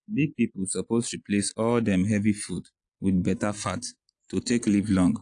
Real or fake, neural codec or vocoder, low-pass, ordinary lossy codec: real; none; 9.9 kHz; AAC, 64 kbps